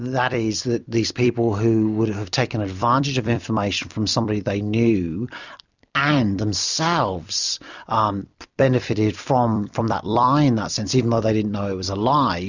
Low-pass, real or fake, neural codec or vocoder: 7.2 kHz; fake; vocoder, 44.1 kHz, 128 mel bands every 256 samples, BigVGAN v2